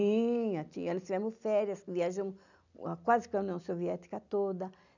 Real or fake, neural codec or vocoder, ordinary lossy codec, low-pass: real; none; none; 7.2 kHz